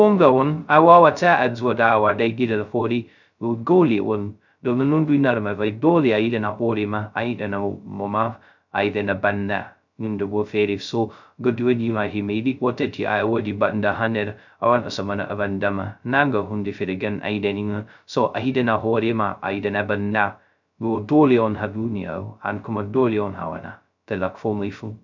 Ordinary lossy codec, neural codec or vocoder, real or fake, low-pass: none; codec, 16 kHz, 0.2 kbps, FocalCodec; fake; 7.2 kHz